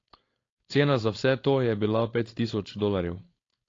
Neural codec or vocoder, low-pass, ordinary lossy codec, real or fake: codec, 16 kHz, 4.8 kbps, FACodec; 7.2 kHz; AAC, 32 kbps; fake